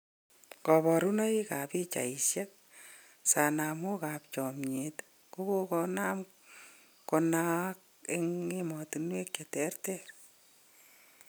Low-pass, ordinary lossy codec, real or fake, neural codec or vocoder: none; none; real; none